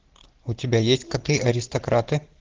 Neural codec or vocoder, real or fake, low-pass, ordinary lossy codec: none; real; 7.2 kHz; Opus, 16 kbps